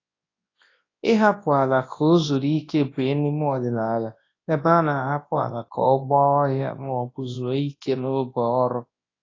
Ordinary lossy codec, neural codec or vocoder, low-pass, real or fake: AAC, 32 kbps; codec, 24 kHz, 0.9 kbps, WavTokenizer, large speech release; 7.2 kHz; fake